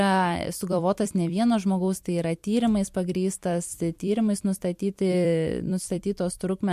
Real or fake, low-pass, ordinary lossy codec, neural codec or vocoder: fake; 14.4 kHz; MP3, 64 kbps; vocoder, 44.1 kHz, 128 mel bands every 256 samples, BigVGAN v2